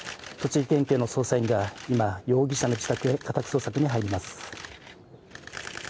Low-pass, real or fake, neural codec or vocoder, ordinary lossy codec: none; real; none; none